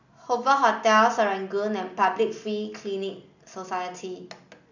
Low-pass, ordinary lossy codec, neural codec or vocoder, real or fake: 7.2 kHz; none; none; real